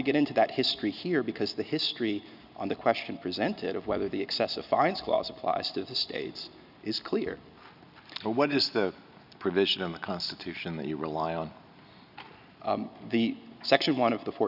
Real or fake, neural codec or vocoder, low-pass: real; none; 5.4 kHz